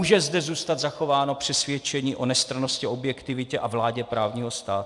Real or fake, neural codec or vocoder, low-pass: fake; vocoder, 44.1 kHz, 128 mel bands every 256 samples, BigVGAN v2; 10.8 kHz